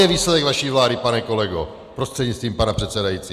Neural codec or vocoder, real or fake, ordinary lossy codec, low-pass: none; real; Opus, 64 kbps; 14.4 kHz